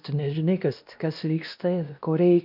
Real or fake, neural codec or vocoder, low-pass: fake; codec, 16 kHz, 1 kbps, X-Codec, WavLM features, trained on Multilingual LibriSpeech; 5.4 kHz